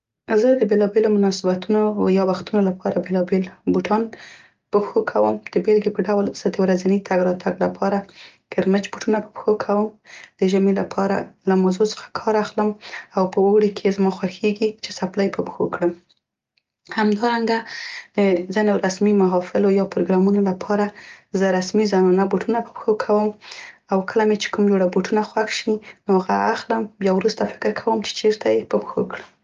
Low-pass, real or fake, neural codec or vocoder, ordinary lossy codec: 7.2 kHz; real; none; Opus, 32 kbps